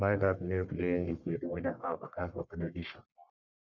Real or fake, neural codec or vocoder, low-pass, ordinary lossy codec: fake; codec, 44.1 kHz, 1.7 kbps, Pupu-Codec; 7.2 kHz; none